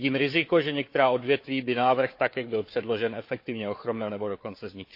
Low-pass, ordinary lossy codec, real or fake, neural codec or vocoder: 5.4 kHz; MP3, 32 kbps; fake; codec, 16 kHz, 4 kbps, FunCodec, trained on Chinese and English, 50 frames a second